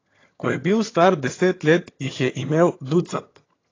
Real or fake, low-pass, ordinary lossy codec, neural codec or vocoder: fake; 7.2 kHz; AAC, 32 kbps; vocoder, 22.05 kHz, 80 mel bands, HiFi-GAN